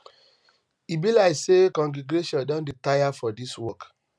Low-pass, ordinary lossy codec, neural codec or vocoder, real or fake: none; none; none; real